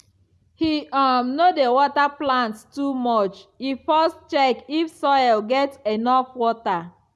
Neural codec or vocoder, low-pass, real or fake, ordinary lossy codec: none; none; real; none